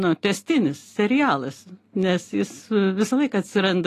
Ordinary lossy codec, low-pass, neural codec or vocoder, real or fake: AAC, 48 kbps; 14.4 kHz; none; real